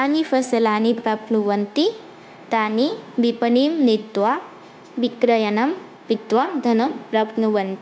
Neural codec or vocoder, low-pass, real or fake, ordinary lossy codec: codec, 16 kHz, 0.9 kbps, LongCat-Audio-Codec; none; fake; none